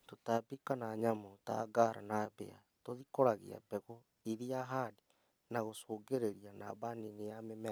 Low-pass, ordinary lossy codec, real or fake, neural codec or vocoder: none; none; real; none